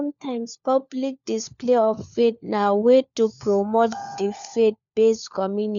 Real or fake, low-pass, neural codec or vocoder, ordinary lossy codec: fake; 7.2 kHz; codec, 16 kHz, 4 kbps, FunCodec, trained on LibriTTS, 50 frames a second; none